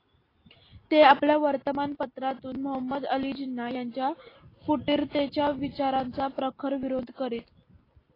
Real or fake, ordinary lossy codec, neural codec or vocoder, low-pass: real; AAC, 24 kbps; none; 5.4 kHz